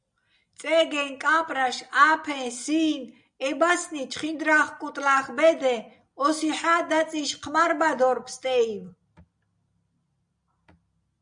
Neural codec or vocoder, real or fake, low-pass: none; real; 9.9 kHz